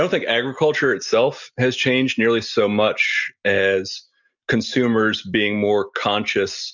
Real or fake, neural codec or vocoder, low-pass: real; none; 7.2 kHz